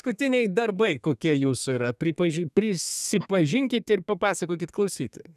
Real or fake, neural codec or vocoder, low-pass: fake; codec, 32 kHz, 1.9 kbps, SNAC; 14.4 kHz